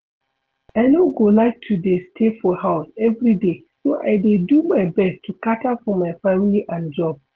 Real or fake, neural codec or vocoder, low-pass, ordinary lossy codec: real; none; 7.2 kHz; Opus, 16 kbps